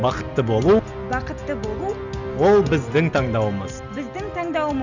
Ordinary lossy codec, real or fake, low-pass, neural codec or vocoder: none; real; 7.2 kHz; none